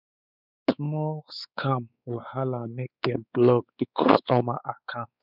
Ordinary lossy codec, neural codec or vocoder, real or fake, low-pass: none; codec, 16 kHz, 4 kbps, X-Codec, WavLM features, trained on Multilingual LibriSpeech; fake; 5.4 kHz